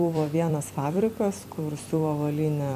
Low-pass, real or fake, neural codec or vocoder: 14.4 kHz; fake; vocoder, 44.1 kHz, 128 mel bands every 256 samples, BigVGAN v2